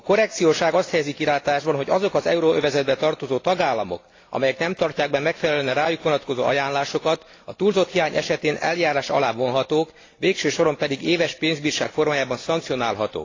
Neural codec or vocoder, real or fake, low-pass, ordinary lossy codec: none; real; 7.2 kHz; AAC, 32 kbps